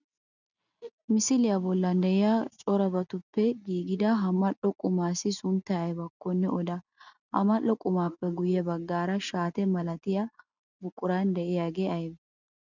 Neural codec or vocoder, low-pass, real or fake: none; 7.2 kHz; real